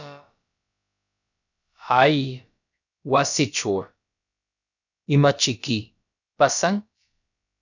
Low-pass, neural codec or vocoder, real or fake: 7.2 kHz; codec, 16 kHz, about 1 kbps, DyCAST, with the encoder's durations; fake